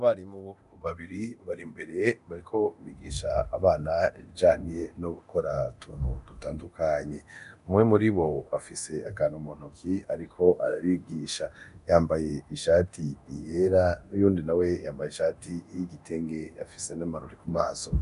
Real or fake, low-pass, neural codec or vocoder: fake; 10.8 kHz; codec, 24 kHz, 0.9 kbps, DualCodec